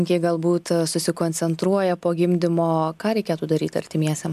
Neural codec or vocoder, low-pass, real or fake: none; 14.4 kHz; real